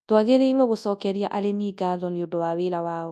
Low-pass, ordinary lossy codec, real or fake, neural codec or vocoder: none; none; fake; codec, 24 kHz, 0.9 kbps, WavTokenizer, large speech release